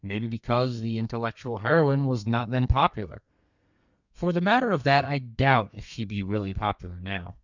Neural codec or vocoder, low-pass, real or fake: codec, 32 kHz, 1.9 kbps, SNAC; 7.2 kHz; fake